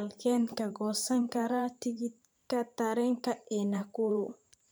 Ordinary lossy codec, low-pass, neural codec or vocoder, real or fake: none; none; vocoder, 44.1 kHz, 128 mel bands every 512 samples, BigVGAN v2; fake